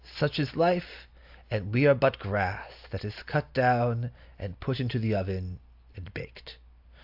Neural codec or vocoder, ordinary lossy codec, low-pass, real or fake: none; AAC, 48 kbps; 5.4 kHz; real